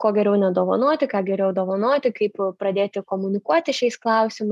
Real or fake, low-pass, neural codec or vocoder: real; 14.4 kHz; none